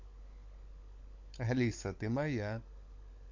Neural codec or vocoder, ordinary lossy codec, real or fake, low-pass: codec, 16 kHz, 16 kbps, FunCodec, trained on LibriTTS, 50 frames a second; MP3, 48 kbps; fake; 7.2 kHz